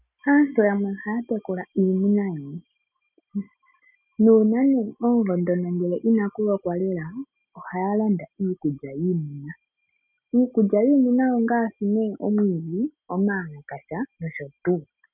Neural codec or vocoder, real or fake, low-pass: none; real; 3.6 kHz